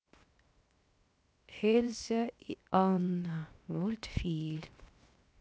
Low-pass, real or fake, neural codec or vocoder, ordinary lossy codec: none; fake; codec, 16 kHz, 0.7 kbps, FocalCodec; none